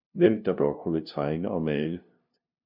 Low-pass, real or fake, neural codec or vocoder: 5.4 kHz; fake; codec, 16 kHz, 0.5 kbps, FunCodec, trained on LibriTTS, 25 frames a second